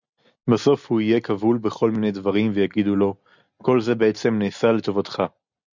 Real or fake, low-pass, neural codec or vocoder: real; 7.2 kHz; none